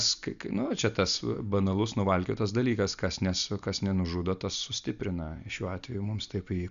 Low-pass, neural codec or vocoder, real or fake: 7.2 kHz; none; real